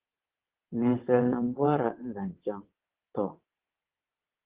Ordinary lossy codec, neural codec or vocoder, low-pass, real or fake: Opus, 16 kbps; vocoder, 22.05 kHz, 80 mel bands, WaveNeXt; 3.6 kHz; fake